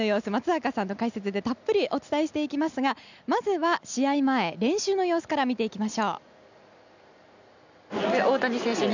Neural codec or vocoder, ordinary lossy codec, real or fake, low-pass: none; none; real; 7.2 kHz